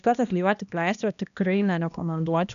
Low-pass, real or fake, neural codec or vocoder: 7.2 kHz; fake; codec, 16 kHz, 1 kbps, X-Codec, HuBERT features, trained on balanced general audio